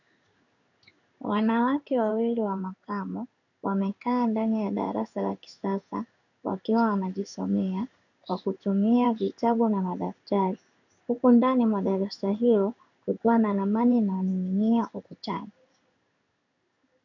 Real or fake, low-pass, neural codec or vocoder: fake; 7.2 kHz; codec, 16 kHz in and 24 kHz out, 1 kbps, XY-Tokenizer